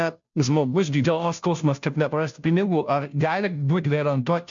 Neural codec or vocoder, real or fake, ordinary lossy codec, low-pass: codec, 16 kHz, 0.5 kbps, FunCodec, trained on Chinese and English, 25 frames a second; fake; MP3, 64 kbps; 7.2 kHz